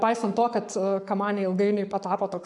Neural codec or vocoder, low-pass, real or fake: codec, 44.1 kHz, 7.8 kbps, Pupu-Codec; 10.8 kHz; fake